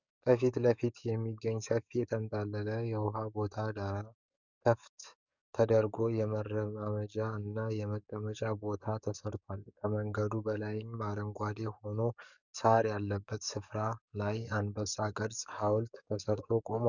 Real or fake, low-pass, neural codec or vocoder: fake; 7.2 kHz; codec, 44.1 kHz, 7.8 kbps, DAC